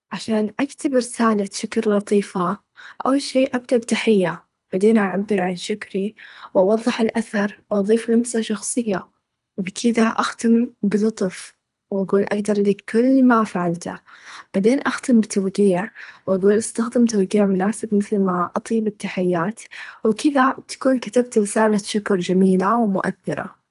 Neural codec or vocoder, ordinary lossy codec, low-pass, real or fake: codec, 24 kHz, 3 kbps, HILCodec; none; 10.8 kHz; fake